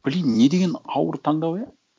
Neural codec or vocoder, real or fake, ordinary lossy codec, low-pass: none; real; none; none